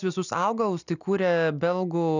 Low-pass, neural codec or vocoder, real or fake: 7.2 kHz; none; real